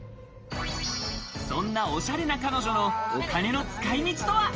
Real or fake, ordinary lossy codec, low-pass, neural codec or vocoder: real; Opus, 24 kbps; 7.2 kHz; none